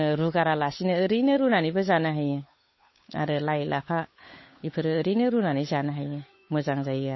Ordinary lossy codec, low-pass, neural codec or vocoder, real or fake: MP3, 24 kbps; 7.2 kHz; codec, 16 kHz, 8 kbps, FunCodec, trained on Chinese and English, 25 frames a second; fake